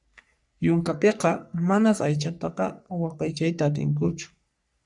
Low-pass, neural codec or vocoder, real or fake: 10.8 kHz; codec, 44.1 kHz, 3.4 kbps, Pupu-Codec; fake